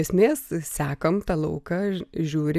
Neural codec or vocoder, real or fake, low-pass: none; real; 14.4 kHz